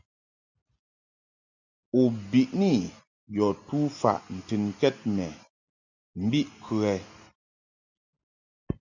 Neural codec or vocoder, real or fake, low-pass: none; real; 7.2 kHz